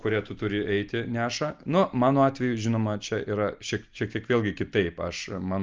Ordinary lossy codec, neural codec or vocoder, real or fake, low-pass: Opus, 24 kbps; none; real; 7.2 kHz